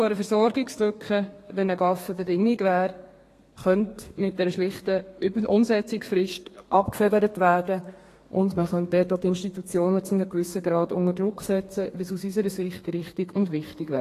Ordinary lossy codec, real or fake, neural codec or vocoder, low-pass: AAC, 48 kbps; fake; codec, 32 kHz, 1.9 kbps, SNAC; 14.4 kHz